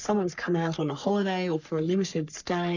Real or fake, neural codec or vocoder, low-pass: fake; codec, 44.1 kHz, 3.4 kbps, Pupu-Codec; 7.2 kHz